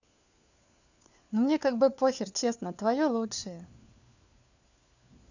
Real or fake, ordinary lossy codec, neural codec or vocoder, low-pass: fake; none; codec, 16 kHz, 4 kbps, FunCodec, trained on LibriTTS, 50 frames a second; 7.2 kHz